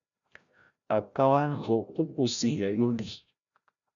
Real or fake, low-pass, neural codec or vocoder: fake; 7.2 kHz; codec, 16 kHz, 0.5 kbps, FreqCodec, larger model